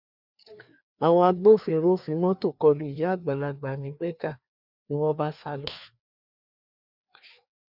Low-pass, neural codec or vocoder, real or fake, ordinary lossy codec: 5.4 kHz; codec, 16 kHz, 2 kbps, FreqCodec, larger model; fake; none